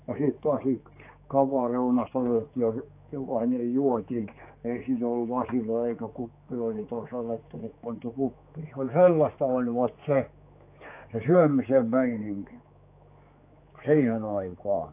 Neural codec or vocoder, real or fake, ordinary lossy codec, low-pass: codec, 16 kHz, 4 kbps, X-Codec, HuBERT features, trained on general audio; fake; AAC, 24 kbps; 3.6 kHz